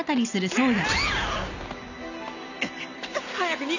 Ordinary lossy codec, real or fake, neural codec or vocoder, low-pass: none; real; none; 7.2 kHz